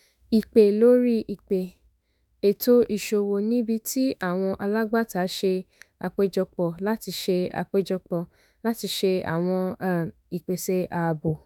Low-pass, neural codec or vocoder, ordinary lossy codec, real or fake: 19.8 kHz; autoencoder, 48 kHz, 32 numbers a frame, DAC-VAE, trained on Japanese speech; none; fake